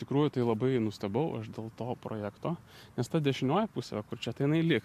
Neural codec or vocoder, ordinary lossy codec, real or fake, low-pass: none; MP3, 64 kbps; real; 14.4 kHz